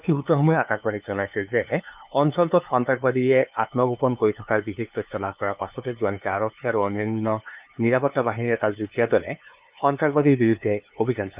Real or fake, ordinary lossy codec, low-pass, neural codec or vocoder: fake; Opus, 24 kbps; 3.6 kHz; codec, 16 kHz, 2 kbps, FunCodec, trained on LibriTTS, 25 frames a second